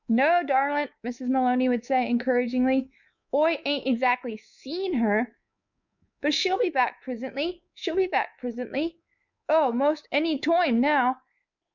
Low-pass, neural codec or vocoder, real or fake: 7.2 kHz; codec, 24 kHz, 3.1 kbps, DualCodec; fake